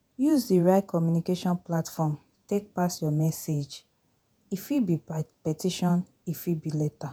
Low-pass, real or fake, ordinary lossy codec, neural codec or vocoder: none; fake; none; vocoder, 48 kHz, 128 mel bands, Vocos